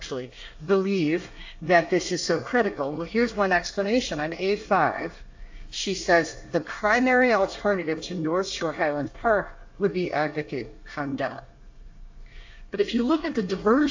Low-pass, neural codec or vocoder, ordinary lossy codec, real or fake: 7.2 kHz; codec, 24 kHz, 1 kbps, SNAC; AAC, 48 kbps; fake